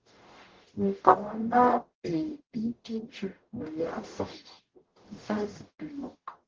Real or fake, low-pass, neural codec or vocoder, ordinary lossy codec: fake; 7.2 kHz; codec, 44.1 kHz, 0.9 kbps, DAC; Opus, 16 kbps